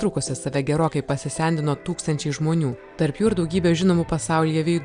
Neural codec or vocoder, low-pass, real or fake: none; 9.9 kHz; real